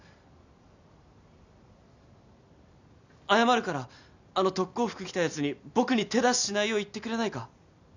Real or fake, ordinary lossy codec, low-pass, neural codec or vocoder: real; none; 7.2 kHz; none